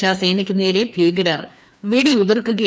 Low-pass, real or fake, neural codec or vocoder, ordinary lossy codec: none; fake; codec, 16 kHz, 2 kbps, FreqCodec, larger model; none